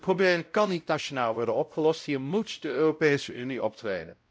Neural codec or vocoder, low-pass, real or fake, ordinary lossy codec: codec, 16 kHz, 0.5 kbps, X-Codec, WavLM features, trained on Multilingual LibriSpeech; none; fake; none